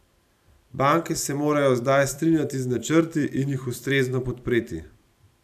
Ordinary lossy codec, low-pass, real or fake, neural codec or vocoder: none; 14.4 kHz; fake; vocoder, 44.1 kHz, 128 mel bands every 256 samples, BigVGAN v2